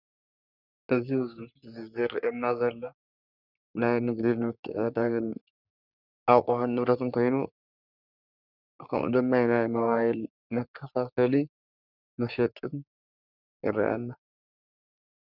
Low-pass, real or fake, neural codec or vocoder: 5.4 kHz; fake; codec, 44.1 kHz, 3.4 kbps, Pupu-Codec